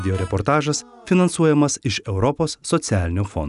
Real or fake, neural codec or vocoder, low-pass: real; none; 10.8 kHz